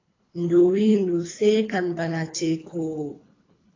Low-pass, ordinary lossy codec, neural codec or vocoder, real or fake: 7.2 kHz; AAC, 32 kbps; codec, 24 kHz, 3 kbps, HILCodec; fake